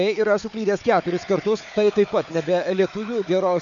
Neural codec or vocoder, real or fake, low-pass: codec, 16 kHz, 4 kbps, FunCodec, trained on Chinese and English, 50 frames a second; fake; 7.2 kHz